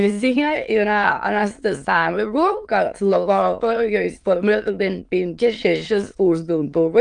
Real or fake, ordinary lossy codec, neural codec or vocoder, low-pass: fake; Opus, 32 kbps; autoencoder, 22.05 kHz, a latent of 192 numbers a frame, VITS, trained on many speakers; 9.9 kHz